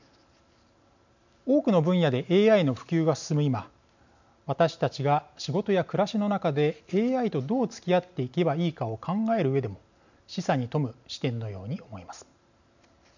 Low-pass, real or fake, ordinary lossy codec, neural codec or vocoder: 7.2 kHz; real; none; none